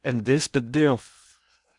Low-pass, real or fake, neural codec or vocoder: 10.8 kHz; fake; codec, 16 kHz in and 24 kHz out, 0.8 kbps, FocalCodec, streaming, 65536 codes